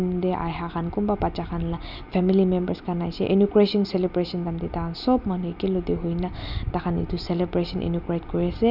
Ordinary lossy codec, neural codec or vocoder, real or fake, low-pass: none; none; real; 5.4 kHz